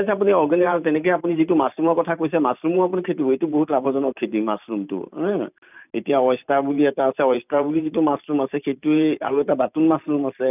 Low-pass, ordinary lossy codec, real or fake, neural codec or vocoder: 3.6 kHz; none; fake; vocoder, 44.1 kHz, 128 mel bands every 512 samples, BigVGAN v2